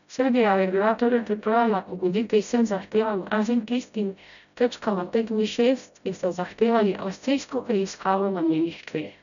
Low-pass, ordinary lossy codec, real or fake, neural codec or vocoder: 7.2 kHz; none; fake; codec, 16 kHz, 0.5 kbps, FreqCodec, smaller model